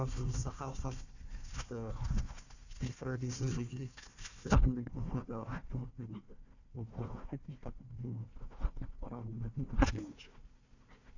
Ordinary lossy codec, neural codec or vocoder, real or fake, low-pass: none; codec, 16 kHz, 1 kbps, FunCodec, trained on Chinese and English, 50 frames a second; fake; 7.2 kHz